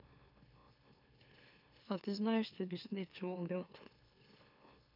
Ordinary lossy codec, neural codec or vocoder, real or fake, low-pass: none; autoencoder, 44.1 kHz, a latent of 192 numbers a frame, MeloTTS; fake; 5.4 kHz